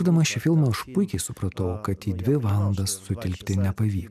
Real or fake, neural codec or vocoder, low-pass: real; none; 14.4 kHz